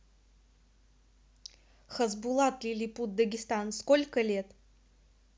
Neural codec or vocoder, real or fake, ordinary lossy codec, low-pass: none; real; none; none